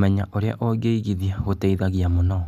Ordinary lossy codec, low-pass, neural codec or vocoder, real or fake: none; 14.4 kHz; none; real